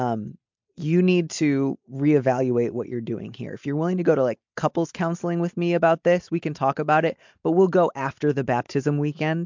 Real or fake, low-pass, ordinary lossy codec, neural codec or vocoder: real; 7.2 kHz; MP3, 64 kbps; none